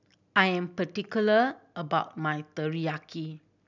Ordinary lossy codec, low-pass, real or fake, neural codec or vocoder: none; 7.2 kHz; real; none